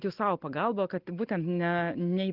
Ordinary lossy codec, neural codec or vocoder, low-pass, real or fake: Opus, 16 kbps; none; 5.4 kHz; real